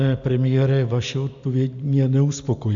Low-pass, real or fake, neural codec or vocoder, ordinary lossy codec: 7.2 kHz; real; none; AAC, 64 kbps